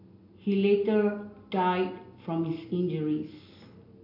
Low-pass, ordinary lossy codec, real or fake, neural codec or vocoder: 5.4 kHz; AAC, 32 kbps; real; none